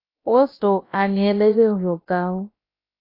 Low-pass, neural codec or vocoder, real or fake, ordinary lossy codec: 5.4 kHz; codec, 16 kHz, about 1 kbps, DyCAST, with the encoder's durations; fake; AAC, 24 kbps